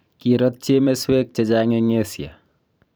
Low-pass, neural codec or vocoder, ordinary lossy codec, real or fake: none; vocoder, 44.1 kHz, 128 mel bands every 512 samples, BigVGAN v2; none; fake